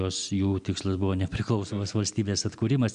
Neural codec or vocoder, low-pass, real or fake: none; 9.9 kHz; real